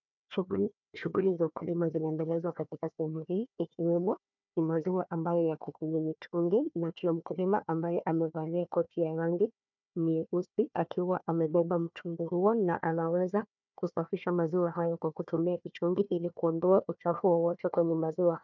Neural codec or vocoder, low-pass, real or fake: codec, 16 kHz, 1 kbps, FunCodec, trained on Chinese and English, 50 frames a second; 7.2 kHz; fake